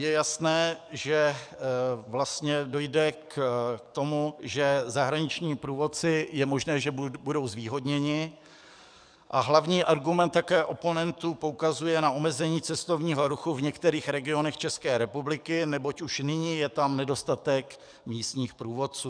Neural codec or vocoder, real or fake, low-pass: codec, 44.1 kHz, 7.8 kbps, DAC; fake; 9.9 kHz